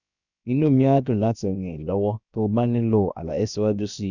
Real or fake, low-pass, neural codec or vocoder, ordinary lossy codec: fake; 7.2 kHz; codec, 16 kHz, 0.7 kbps, FocalCodec; none